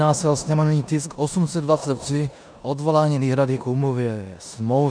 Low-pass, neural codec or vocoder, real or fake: 9.9 kHz; codec, 16 kHz in and 24 kHz out, 0.9 kbps, LongCat-Audio-Codec, four codebook decoder; fake